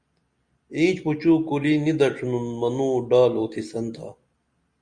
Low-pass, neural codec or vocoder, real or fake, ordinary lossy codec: 9.9 kHz; none; real; Opus, 64 kbps